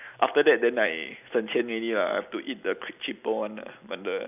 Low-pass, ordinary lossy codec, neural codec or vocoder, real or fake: 3.6 kHz; none; none; real